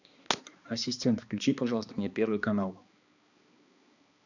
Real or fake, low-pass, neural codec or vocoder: fake; 7.2 kHz; codec, 16 kHz, 2 kbps, X-Codec, HuBERT features, trained on balanced general audio